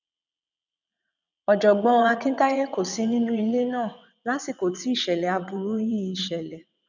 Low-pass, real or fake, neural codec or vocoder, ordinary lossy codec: 7.2 kHz; fake; vocoder, 22.05 kHz, 80 mel bands, WaveNeXt; none